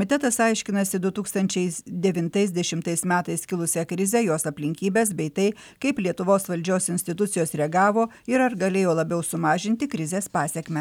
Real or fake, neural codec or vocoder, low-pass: real; none; 19.8 kHz